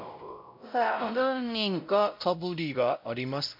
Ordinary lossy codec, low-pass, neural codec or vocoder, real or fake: MP3, 48 kbps; 5.4 kHz; codec, 16 kHz, 0.5 kbps, X-Codec, WavLM features, trained on Multilingual LibriSpeech; fake